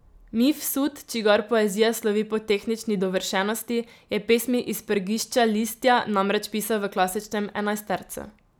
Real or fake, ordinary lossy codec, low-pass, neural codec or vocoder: real; none; none; none